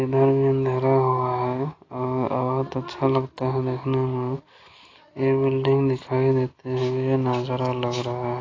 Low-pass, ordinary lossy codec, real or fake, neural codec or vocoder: 7.2 kHz; AAC, 32 kbps; real; none